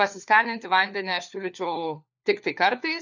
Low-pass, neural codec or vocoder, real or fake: 7.2 kHz; codec, 16 kHz, 4 kbps, FunCodec, trained on LibriTTS, 50 frames a second; fake